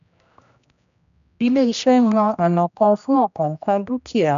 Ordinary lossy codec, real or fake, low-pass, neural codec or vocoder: none; fake; 7.2 kHz; codec, 16 kHz, 1 kbps, X-Codec, HuBERT features, trained on general audio